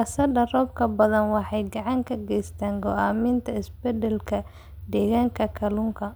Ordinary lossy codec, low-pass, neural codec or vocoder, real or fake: none; none; none; real